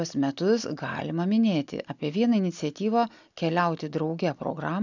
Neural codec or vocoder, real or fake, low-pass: none; real; 7.2 kHz